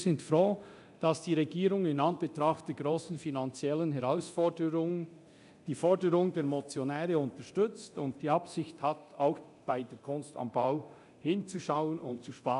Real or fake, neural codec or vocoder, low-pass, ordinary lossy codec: fake; codec, 24 kHz, 0.9 kbps, DualCodec; 10.8 kHz; none